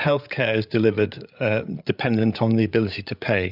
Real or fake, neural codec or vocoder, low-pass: fake; codec, 16 kHz, 8 kbps, FreqCodec, larger model; 5.4 kHz